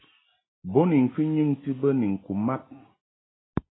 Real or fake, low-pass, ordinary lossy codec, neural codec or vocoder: real; 7.2 kHz; AAC, 16 kbps; none